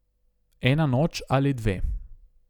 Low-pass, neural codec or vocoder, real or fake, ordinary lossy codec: 19.8 kHz; none; real; none